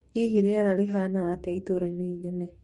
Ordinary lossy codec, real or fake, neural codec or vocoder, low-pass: MP3, 48 kbps; fake; codec, 44.1 kHz, 2.6 kbps, DAC; 19.8 kHz